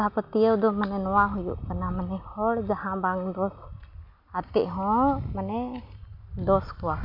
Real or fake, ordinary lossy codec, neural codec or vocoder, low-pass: real; none; none; 5.4 kHz